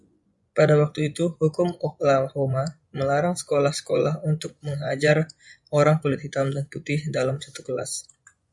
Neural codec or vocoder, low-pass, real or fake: vocoder, 24 kHz, 100 mel bands, Vocos; 10.8 kHz; fake